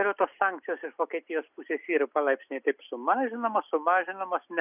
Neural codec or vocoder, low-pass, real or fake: none; 3.6 kHz; real